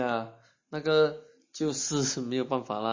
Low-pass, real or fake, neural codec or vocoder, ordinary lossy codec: 7.2 kHz; real; none; MP3, 32 kbps